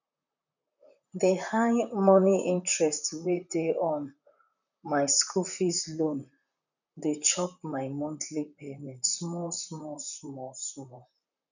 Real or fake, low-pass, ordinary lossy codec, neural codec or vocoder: fake; 7.2 kHz; none; vocoder, 44.1 kHz, 128 mel bands, Pupu-Vocoder